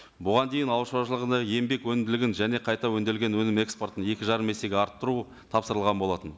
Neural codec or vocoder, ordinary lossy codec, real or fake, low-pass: none; none; real; none